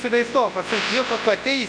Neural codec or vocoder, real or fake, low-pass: codec, 24 kHz, 0.9 kbps, WavTokenizer, large speech release; fake; 9.9 kHz